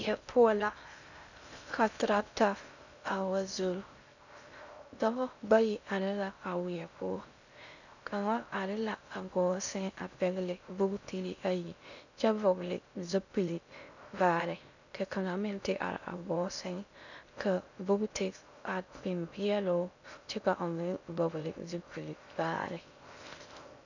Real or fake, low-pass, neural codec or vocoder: fake; 7.2 kHz; codec, 16 kHz in and 24 kHz out, 0.6 kbps, FocalCodec, streaming, 2048 codes